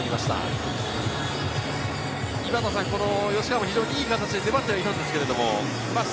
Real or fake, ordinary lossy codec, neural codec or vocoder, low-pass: real; none; none; none